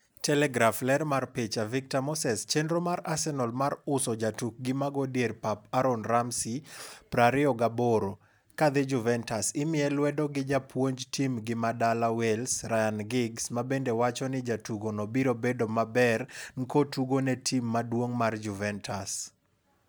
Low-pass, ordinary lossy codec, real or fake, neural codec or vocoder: none; none; real; none